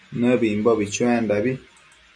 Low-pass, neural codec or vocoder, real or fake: 9.9 kHz; none; real